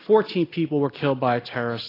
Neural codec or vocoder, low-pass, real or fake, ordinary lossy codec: none; 5.4 kHz; real; AAC, 24 kbps